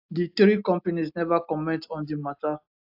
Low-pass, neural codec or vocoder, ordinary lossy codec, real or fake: 5.4 kHz; none; AAC, 48 kbps; real